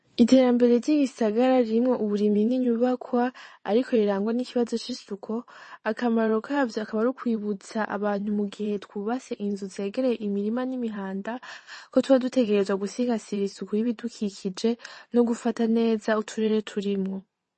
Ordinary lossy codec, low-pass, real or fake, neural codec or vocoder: MP3, 32 kbps; 9.9 kHz; fake; vocoder, 22.05 kHz, 80 mel bands, WaveNeXt